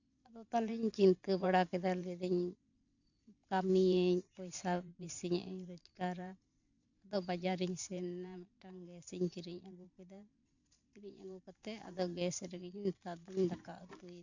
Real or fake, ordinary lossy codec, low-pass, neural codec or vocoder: fake; none; 7.2 kHz; vocoder, 44.1 kHz, 128 mel bands every 512 samples, BigVGAN v2